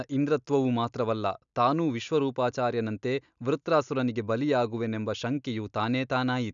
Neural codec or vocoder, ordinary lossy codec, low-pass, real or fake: none; none; 7.2 kHz; real